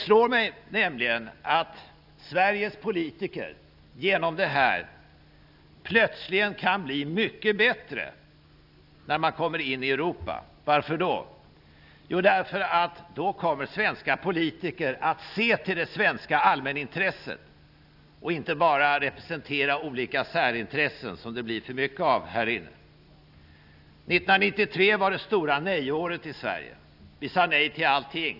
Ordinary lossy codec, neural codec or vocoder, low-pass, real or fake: none; none; 5.4 kHz; real